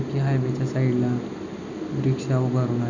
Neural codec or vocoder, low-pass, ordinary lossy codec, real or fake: none; 7.2 kHz; none; real